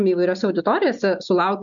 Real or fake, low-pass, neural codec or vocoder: real; 7.2 kHz; none